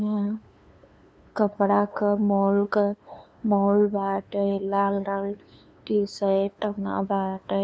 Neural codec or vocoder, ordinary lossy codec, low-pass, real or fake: codec, 16 kHz, 2 kbps, FunCodec, trained on LibriTTS, 25 frames a second; none; none; fake